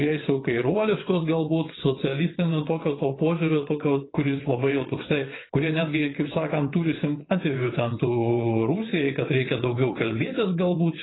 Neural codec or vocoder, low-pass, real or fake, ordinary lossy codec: vocoder, 22.05 kHz, 80 mel bands, WaveNeXt; 7.2 kHz; fake; AAC, 16 kbps